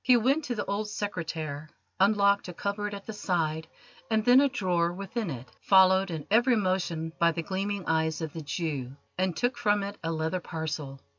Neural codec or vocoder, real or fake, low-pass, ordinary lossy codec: none; real; 7.2 kHz; MP3, 64 kbps